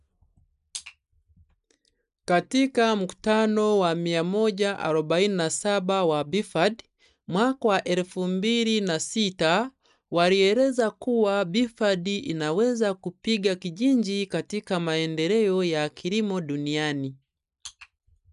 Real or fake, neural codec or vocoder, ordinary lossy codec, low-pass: real; none; none; 10.8 kHz